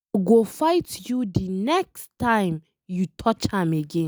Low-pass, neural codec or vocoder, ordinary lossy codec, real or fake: none; none; none; real